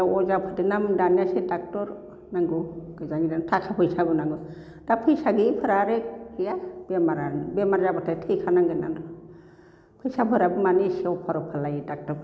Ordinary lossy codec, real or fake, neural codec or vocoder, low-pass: none; real; none; none